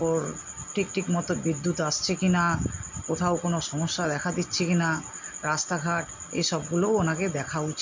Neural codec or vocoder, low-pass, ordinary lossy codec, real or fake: none; 7.2 kHz; MP3, 64 kbps; real